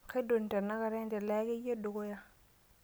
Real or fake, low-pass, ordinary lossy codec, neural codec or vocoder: real; none; none; none